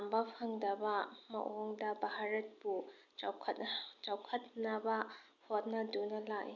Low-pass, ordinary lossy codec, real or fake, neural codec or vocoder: 7.2 kHz; none; real; none